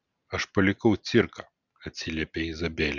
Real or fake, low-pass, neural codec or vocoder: real; 7.2 kHz; none